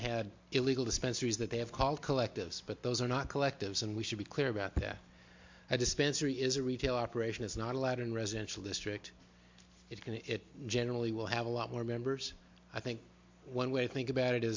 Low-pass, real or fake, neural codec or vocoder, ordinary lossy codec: 7.2 kHz; real; none; MP3, 48 kbps